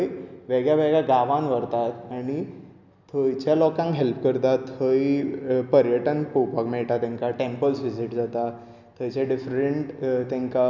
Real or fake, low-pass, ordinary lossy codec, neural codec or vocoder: real; 7.2 kHz; none; none